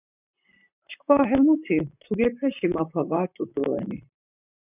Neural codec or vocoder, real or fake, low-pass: vocoder, 44.1 kHz, 128 mel bands, Pupu-Vocoder; fake; 3.6 kHz